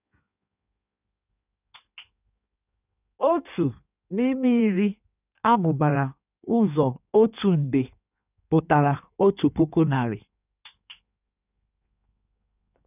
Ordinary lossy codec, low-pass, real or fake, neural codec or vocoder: none; 3.6 kHz; fake; codec, 16 kHz in and 24 kHz out, 1.1 kbps, FireRedTTS-2 codec